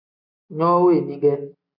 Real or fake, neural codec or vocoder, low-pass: real; none; 5.4 kHz